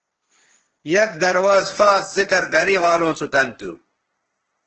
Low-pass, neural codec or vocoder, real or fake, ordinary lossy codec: 7.2 kHz; codec, 16 kHz, 1.1 kbps, Voila-Tokenizer; fake; Opus, 16 kbps